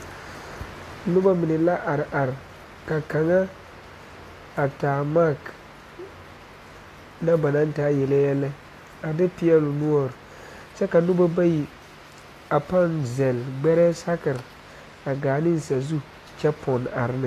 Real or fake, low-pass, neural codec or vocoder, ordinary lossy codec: real; 14.4 kHz; none; AAC, 48 kbps